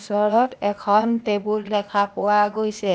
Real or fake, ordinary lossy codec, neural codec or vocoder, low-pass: fake; none; codec, 16 kHz, 0.8 kbps, ZipCodec; none